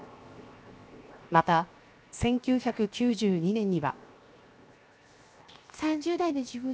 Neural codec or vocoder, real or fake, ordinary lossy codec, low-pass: codec, 16 kHz, 0.7 kbps, FocalCodec; fake; none; none